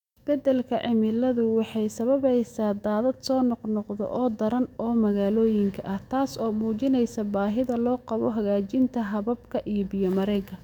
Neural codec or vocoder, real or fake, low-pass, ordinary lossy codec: autoencoder, 48 kHz, 128 numbers a frame, DAC-VAE, trained on Japanese speech; fake; 19.8 kHz; none